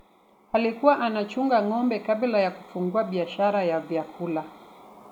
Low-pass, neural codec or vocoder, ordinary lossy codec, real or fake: 19.8 kHz; none; none; real